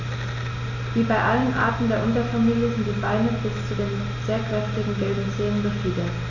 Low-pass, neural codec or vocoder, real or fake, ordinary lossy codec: 7.2 kHz; none; real; none